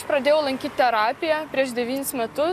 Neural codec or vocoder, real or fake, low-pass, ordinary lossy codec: none; real; 14.4 kHz; AAC, 96 kbps